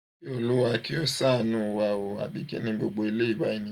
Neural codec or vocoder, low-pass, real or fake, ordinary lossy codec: none; 19.8 kHz; real; none